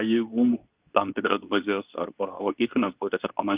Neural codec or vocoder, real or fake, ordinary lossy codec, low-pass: codec, 24 kHz, 0.9 kbps, WavTokenizer, medium speech release version 2; fake; Opus, 32 kbps; 3.6 kHz